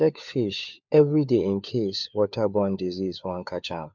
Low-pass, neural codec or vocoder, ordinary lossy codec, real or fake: 7.2 kHz; codec, 16 kHz, 4 kbps, FunCodec, trained on LibriTTS, 50 frames a second; MP3, 64 kbps; fake